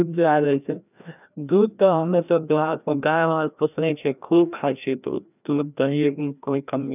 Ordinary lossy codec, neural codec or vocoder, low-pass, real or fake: none; codec, 16 kHz, 1 kbps, FreqCodec, larger model; 3.6 kHz; fake